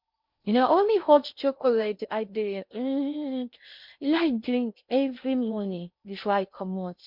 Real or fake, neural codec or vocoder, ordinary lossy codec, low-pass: fake; codec, 16 kHz in and 24 kHz out, 0.6 kbps, FocalCodec, streaming, 4096 codes; MP3, 48 kbps; 5.4 kHz